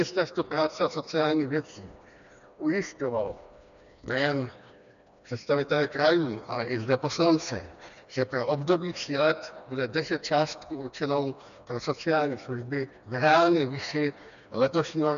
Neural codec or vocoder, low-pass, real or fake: codec, 16 kHz, 2 kbps, FreqCodec, smaller model; 7.2 kHz; fake